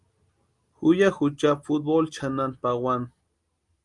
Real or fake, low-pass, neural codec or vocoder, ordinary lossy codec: real; 10.8 kHz; none; Opus, 32 kbps